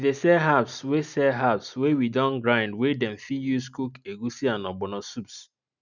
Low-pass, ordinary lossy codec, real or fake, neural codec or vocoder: 7.2 kHz; none; real; none